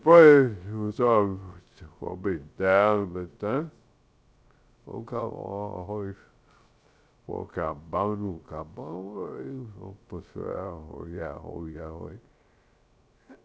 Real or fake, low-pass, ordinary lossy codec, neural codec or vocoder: fake; none; none; codec, 16 kHz, 0.3 kbps, FocalCodec